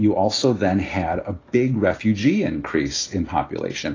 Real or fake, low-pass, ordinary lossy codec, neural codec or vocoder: real; 7.2 kHz; AAC, 32 kbps; none